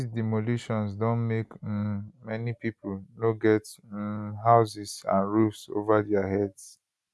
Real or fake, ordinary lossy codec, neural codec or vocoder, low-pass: real; none; none; none